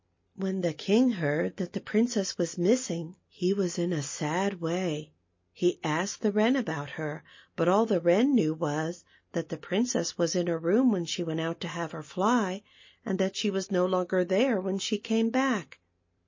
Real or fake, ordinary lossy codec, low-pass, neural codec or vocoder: real; MP3, 32 kbps; 7.2 kHz; none